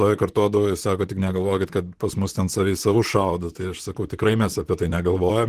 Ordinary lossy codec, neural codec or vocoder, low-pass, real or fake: Opus, 16 kbps; vocoder, 44.1 kHz, 128 mel bands, Pupu-Vocoder; 14.4 kHz; fake